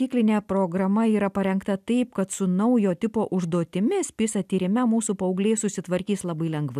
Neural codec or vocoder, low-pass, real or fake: none; 14.4 kHz; real